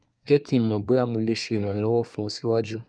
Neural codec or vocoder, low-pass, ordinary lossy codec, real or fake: codec, 24 kHz, 1 kbps, SNAC; 9.9 kHz; none; fake